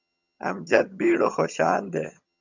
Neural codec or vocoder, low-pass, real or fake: vocoder, 22.05 kHz, 80 mel bands, HiFi-GAN; 7.2 kHz; fake